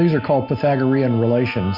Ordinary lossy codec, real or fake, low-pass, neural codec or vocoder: MP3, 48 kbps; real; 5.4 kHz; none